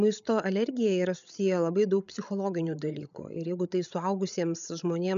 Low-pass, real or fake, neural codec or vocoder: 7.2 kHz; fake; codec, 16 kHz, 16 kbps, FreqCodec, larger model